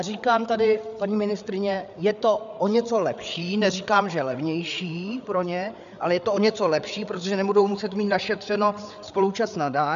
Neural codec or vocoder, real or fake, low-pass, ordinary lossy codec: codec, 16 kHz, 8 kbps, FreqCodec, larger model; fake; 7.2 kHz; AAC, 96 kbps